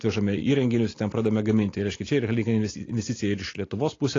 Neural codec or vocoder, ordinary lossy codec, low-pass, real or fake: none; AAC, 32 kbps; 7.2 kHz; real